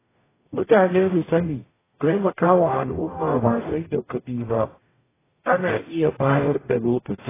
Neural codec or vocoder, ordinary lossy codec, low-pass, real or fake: codec, 44.1 kHz, 0.9 kbps, DAC; AAC, 16 kbps; 3.6 kHz; fake